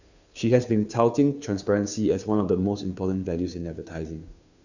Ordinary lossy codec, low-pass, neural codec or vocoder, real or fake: none; 7.2 kHz; codec, 16 kHz, 2 kbps, FunCodec, trained on Chinese and English, 25 frames a second; fake